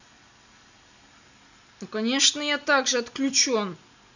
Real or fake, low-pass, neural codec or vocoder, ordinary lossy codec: real; 7.2 kHz; none; none